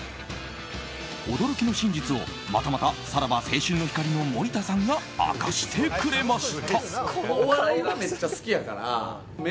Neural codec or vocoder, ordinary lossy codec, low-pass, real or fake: none; none; none; real